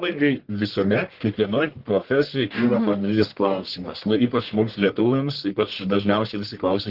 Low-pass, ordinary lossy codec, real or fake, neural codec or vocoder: 5.4 kHz; Opus, 16 kbps; fake; codec, 44.1 kHz, 1.7 kbps, Pupu-Codec